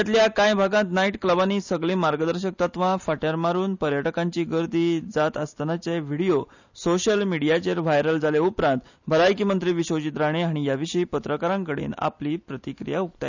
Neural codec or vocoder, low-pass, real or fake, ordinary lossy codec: none; 7.2 kHz; real; none